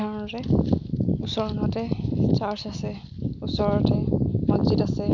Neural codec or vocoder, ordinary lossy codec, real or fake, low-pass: none; none; real; 7.2 kHz